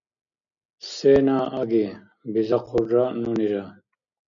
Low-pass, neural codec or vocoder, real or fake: 7.2 kHz; none; real